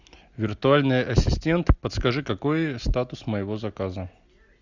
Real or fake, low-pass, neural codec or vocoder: real; 7.2 kHz; none